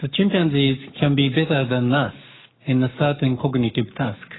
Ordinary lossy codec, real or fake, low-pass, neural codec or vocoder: AAC, 16 kbps; fake; 7.2 kHz; codec, 44.1 kHz, 7.8 kbps, Pupu-Codec